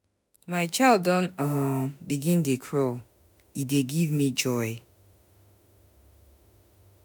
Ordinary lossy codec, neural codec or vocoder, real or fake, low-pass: none; autoencoder, 48 kHz, 32 numbers a frame, DAC-VAE, trained on Japanese speech; fake; none